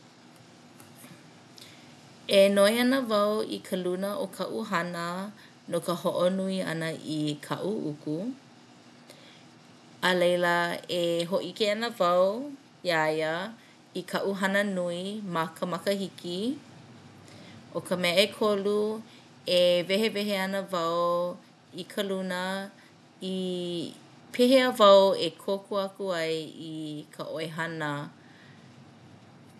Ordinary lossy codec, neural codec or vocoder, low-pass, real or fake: none; none; none; real